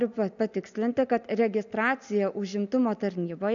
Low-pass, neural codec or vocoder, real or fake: 7.2 kHz; none; real